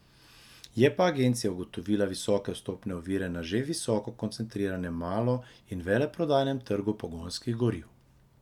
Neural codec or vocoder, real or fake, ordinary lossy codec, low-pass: none; real; none; 19.8 kHz